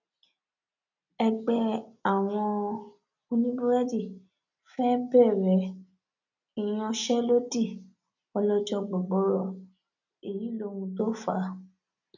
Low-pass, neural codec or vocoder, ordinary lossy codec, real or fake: 7.2 kHz; none; none; real